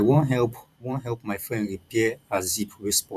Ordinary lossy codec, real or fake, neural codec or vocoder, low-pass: none; fake; vocoder, 44.1 kHz, 128 mel bands every 512 samples, BigVGAN v2; 14.4 kHz